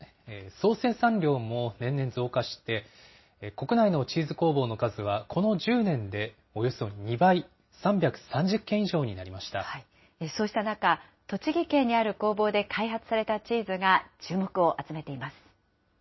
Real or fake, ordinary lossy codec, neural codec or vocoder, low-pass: real; MP3, 24 kbps; none; 7.2 kHz